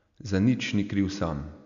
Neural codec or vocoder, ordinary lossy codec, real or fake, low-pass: none; none; real; 7.2 kHz